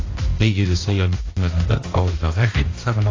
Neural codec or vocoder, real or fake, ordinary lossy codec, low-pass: codec, 16 kHz, 1 kbps, X-Codec, HuBERT features, trained on balanced general audio; fake; AAC, 32 kbps; 7.2 kHz